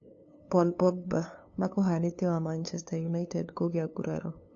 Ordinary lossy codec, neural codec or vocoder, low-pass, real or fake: AAC, 48 kbps; codec, 16 kHz, 2 kbps, FunCodec, trained on LibriTTS, 25 frames a second; 7.2 kHz; fake